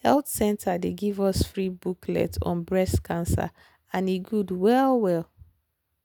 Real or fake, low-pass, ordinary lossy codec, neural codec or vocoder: real; none; none; none